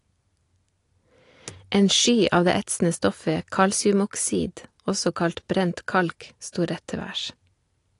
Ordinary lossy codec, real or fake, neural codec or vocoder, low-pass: AAC, 48 kbps; real; none; 10.8 kHz